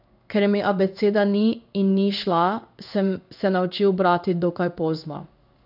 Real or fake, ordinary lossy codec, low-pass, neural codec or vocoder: fake; none; 5.4 kHz; codec, 16 kHz in and 24 kHz out, 1 kbps, XY-Tokenizer